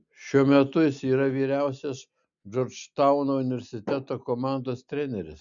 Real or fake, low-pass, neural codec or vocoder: real; 7.2 kHz; none